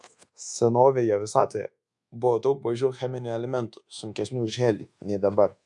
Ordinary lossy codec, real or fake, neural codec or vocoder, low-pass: AAC, 64 kbps; fake; codec, 24 kHz, 1.2 kbps, DualCodec; 10.8 kHz